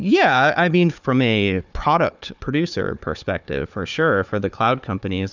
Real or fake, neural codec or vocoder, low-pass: fake; codec, 16 kHz, 4 kbps, FunCodec, trained on Chinese and English, 50 frames a second; 7.2 kHz